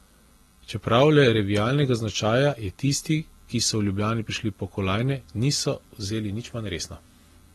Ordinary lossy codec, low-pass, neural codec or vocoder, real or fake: AAC, 32 kbps; 19.8 kHz; none; real